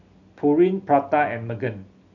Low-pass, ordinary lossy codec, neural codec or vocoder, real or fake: 7.2 kHz; none; none; real